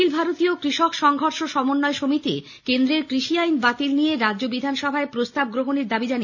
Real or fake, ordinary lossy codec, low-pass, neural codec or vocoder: real; none; 7.2 kHz; none